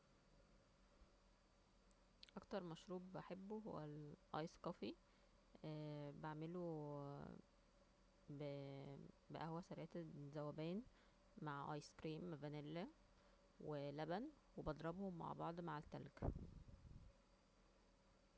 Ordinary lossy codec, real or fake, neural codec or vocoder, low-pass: none; real; none; none